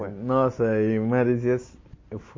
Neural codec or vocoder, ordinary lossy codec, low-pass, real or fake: none; MP3, 32 kbps; 7.2 kHz; real